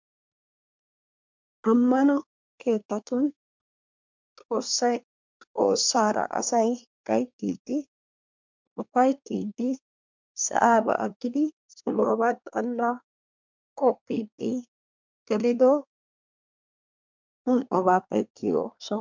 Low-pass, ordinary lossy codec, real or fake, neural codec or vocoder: 7.2 kHz; MP3, 64 kbps; fake; codec, 24 kHz, 1 kbps, SNAC